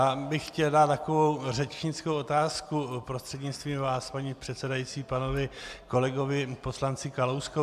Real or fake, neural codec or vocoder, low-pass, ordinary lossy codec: real; none; 14.4 kHz; AAC, 96 kbps